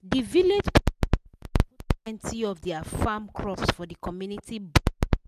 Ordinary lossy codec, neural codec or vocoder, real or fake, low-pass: none; none; real; 14.4 kHz